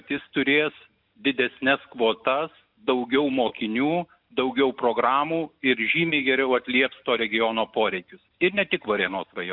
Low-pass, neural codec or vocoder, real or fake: 5.4 kHz; none; real